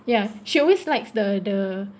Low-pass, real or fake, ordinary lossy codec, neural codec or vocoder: none; real; none; none